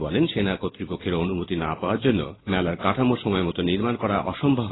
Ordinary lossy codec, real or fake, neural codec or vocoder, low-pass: AAC, 16 kbps; real; none; 7.2 kHz